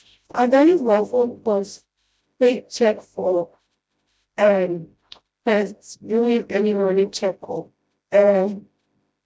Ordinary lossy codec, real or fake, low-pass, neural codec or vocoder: none; fake; none; codec, 16 kHz, 0.5 kbps, FreqCodec, smaller model